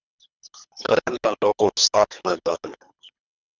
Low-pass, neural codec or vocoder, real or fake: 7.2 kHz; codec, 24 kHz, 3 kbps, HILCodec; fake